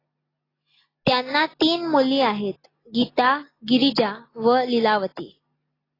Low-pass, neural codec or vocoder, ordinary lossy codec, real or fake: 5.4 kHz; none; AAC, 24 kbps; real